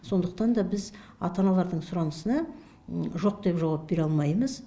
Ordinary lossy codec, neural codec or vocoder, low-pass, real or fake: none; none; none; real